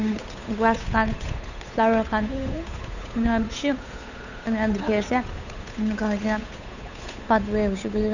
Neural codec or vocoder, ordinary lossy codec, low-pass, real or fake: codec, 16 kHz, 8 kbps, FunCodec, trained on Chinese and English, 25 frames a second; none; 7.2 kHz; fake